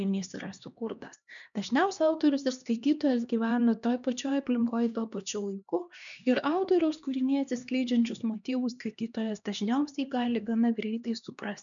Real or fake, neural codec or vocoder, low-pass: fake; codec, 16 kHz, 2 kbps, X-Codec, HuBERT features, trained on LibriSpeech; 7.2 kHz